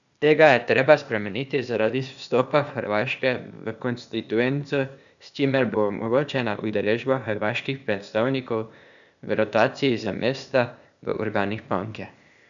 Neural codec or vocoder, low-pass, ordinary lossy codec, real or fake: codec, 16 kHz, 0.8 kbps, ZipCodec; 7.2 kHz; none; fake